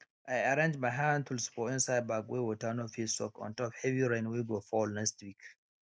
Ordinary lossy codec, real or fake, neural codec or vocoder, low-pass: none; real; none; none